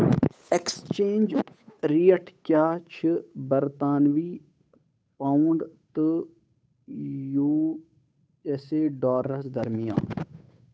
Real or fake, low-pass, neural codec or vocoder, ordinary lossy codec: fake; none; codec, 16 kHz, 8 kbps, FunCodec, trained on Chinese and English, 25 frames a second; none